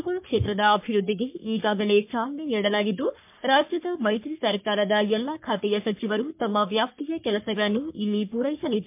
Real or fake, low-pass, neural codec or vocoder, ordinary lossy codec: fake; 3.6 kHz; codec, 44.1 kHz, 3.4 kbps, Pupu-Codec; MP3, 32 kbps